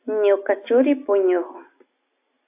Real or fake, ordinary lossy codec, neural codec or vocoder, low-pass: real; AAC, 24 kbps; none; 3.6 kHz